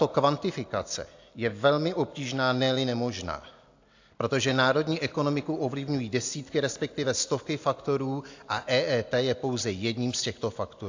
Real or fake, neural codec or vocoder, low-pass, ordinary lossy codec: real; none; 7.2 kHz; AAC, 48 kbps